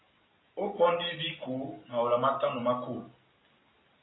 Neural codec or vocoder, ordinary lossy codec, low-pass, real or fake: none; AAC, 16 kbps; 7.2 kHz; real